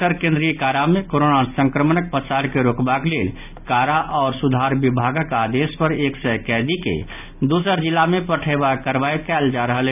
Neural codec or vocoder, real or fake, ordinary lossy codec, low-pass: none; real; none; 3.6 kHz